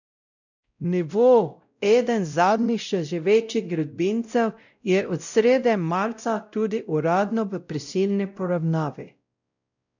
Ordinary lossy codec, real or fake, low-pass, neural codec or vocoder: none; fake; 7.2 kHz; codec, 16 kHz, 0.5 kbps, X-Codec, WavLM features, trained on Multilingual LibriSpeech